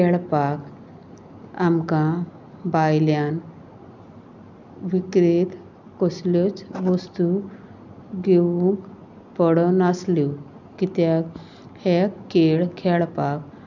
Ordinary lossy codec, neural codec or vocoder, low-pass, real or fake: none; none; 7.2 kHz; real